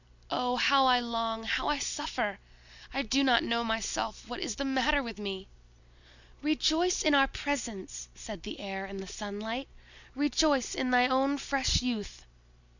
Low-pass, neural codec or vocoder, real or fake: 7.2 kHz; none; real